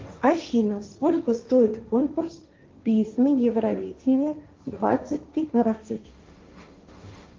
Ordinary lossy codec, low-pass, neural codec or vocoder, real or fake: Opus, 24 kbps; 7.2 kHz; codec, 16 kHz, 1.1 kbps, Voila-Tokenizer; fake